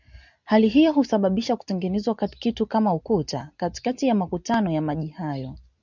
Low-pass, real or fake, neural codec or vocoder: 7.2 kHz; real; none